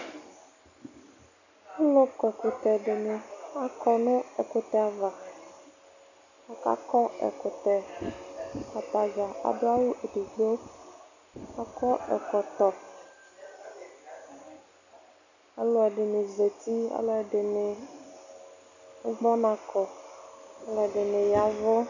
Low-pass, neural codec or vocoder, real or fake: 7.2 kHz; none; real